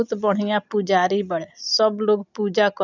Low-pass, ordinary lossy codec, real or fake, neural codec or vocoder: 7.2 kHz; none; real; none